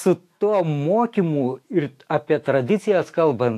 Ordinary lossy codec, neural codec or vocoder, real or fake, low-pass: AAC, 64 kbps; autoencoder, 48 kHz, 128 numbers a frame, DAC-VAE, trained on Japanese speech; fake; 14.4 kHz